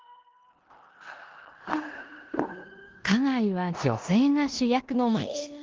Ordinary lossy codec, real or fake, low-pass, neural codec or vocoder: Opus, 16 kbps; fake; 7.2 kHz; codec, 16 kHz in and 24 kHz out, 0.9 kbps, LongCat-Audio-Codec, four codebook decoder